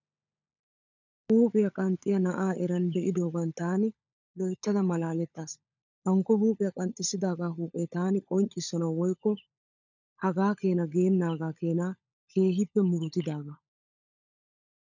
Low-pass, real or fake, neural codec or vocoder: 7.2 kHz; fake; codec, 16 kHz, 16 kbps, FunCodec, trained on LibriTTS, 50 frames a second